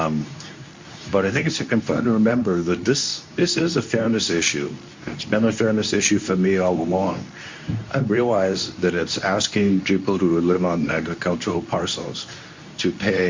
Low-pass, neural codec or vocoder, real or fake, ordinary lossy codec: 7.2 kHz; codec, 24 kHz, 0.9 kbps, WavTokenizer, medium speech release version 2; fake; MP3, 64 kbps